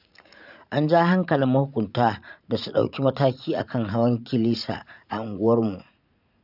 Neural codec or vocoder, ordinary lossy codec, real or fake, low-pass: vocoder, 44.1 kHz, 80 mel bands, Vocos; none; fake; 5.4 kHz